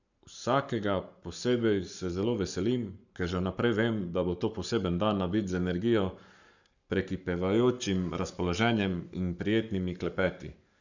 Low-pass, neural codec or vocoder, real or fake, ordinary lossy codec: 7.2 kHz; codec, 44.1 kHz, 7.8 kbps, Pupu-Codec; fake; none